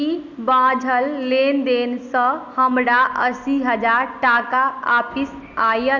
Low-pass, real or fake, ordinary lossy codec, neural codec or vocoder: 7.2 kHz; real; none; none